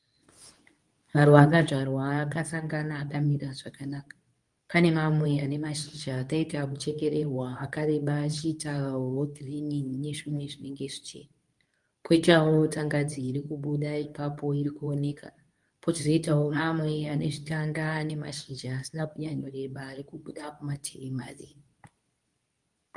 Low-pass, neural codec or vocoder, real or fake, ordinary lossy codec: 10.8 kHz; codec, 24 kHz, 0.9 kbps, WavTokenizer, medium speech release version 2; fake; Opus, 32 kbps